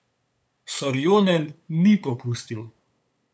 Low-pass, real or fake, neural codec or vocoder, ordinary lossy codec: none; fake; codec, 16 kHz, 8 kbps, FunCodec, trained on LibriTTS, 25 frames a second; none